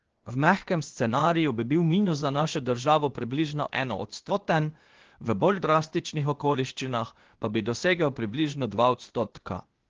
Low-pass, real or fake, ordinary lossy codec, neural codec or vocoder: 7.2 kHz; fake; Opus, 16 kbps; codec, 16 kHz, 0.8 kbps, ZipCodec